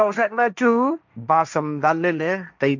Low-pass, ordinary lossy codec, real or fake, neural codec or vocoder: 7.2 kHz; none; fake; codec, 16 kHz, 1.1 kbps, Voila-Tokenizer